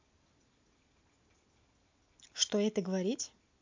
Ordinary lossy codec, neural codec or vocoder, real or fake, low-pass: MP3, 48 kbps; none; real; 7.2 kHz